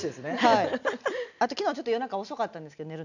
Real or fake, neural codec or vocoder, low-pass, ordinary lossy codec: real; none; 7.2 kHz; none